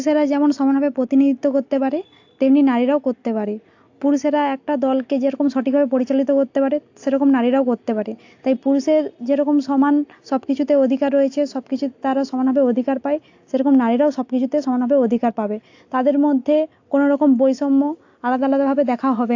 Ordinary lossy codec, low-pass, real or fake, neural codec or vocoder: AAC, 48 kbps; 7.2 kHz; real; none